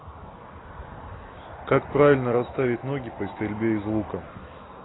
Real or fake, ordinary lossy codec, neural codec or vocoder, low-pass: real; AAC, 16 kbps; none; 7.2 kHz